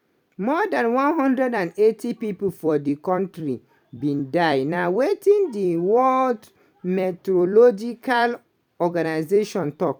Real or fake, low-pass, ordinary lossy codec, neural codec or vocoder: fake; 19.8 kHz; none; vocoder, 44.1 kHz, 128 mel bands every 256 samples, BigVGAN v2